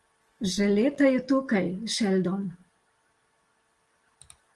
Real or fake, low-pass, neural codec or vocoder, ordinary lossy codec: real; 10.8 kHz; none; Opus, 24 kbps